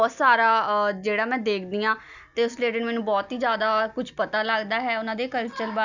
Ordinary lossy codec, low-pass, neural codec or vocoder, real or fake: none; 7.2 kHz; none; real